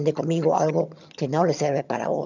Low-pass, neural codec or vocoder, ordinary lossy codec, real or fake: 7.2 kHz; vocoder, 22.05 kHz, 80 mel bands, HiFi-GAN; none; fake